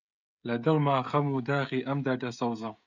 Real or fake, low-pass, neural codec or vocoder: fake; 7.2 kHz; codec, 16 kHz, 16 kbps, FreqCodec, smaller model